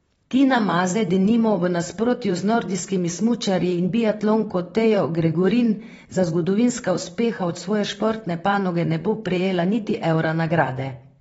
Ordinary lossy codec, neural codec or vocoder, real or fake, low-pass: AAC, 24 kbps; vocoder, 44.1 kHz, 128 mel bands, Pupu-Vocoder; fake; 19.8 kHz